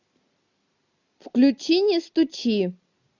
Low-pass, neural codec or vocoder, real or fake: 7.2 kHz; none; real